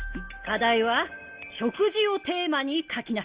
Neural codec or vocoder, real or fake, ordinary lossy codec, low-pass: none; real; Opus, 32 kbps; 3.6 kHz